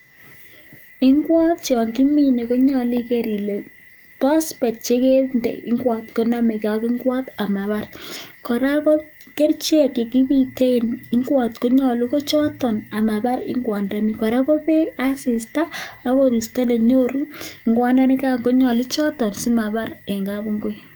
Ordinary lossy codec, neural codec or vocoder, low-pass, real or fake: none; codec, 44.1 kHz, 7.8 kbps, DAC; none; fake